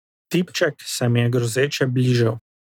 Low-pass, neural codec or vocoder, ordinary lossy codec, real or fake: 19.8 kHz; none; none; real